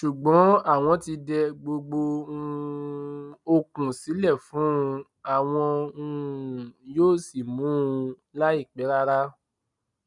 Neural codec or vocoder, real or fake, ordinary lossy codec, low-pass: none; real; none; 10.8 kHz